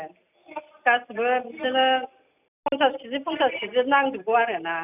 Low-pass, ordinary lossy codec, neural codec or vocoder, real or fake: 3.6 kHz; none; none; real